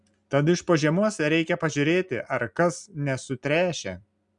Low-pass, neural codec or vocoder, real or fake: 10.8 kHz; none; real